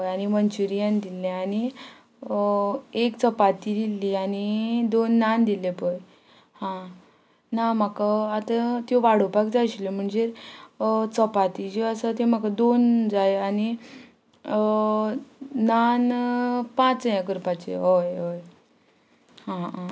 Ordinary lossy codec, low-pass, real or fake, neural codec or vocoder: none; none; real; none